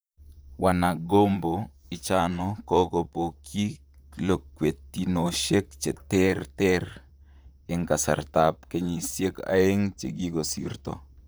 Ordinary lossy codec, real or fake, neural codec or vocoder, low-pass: none; fake; vocoder, 44.1 kHz, 128 mel bands, Pupu-Vocoder; none